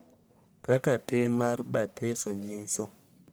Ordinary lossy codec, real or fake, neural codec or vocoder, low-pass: none; fake; codec, 44.1 kHz, 1.7 kbps, Pupu-Codec; none